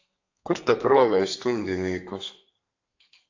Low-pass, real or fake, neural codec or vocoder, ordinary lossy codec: 7.2 kHz; fake; codec, 32 kHz, 1.9 kbps, SNAC; AAC, 48 kbps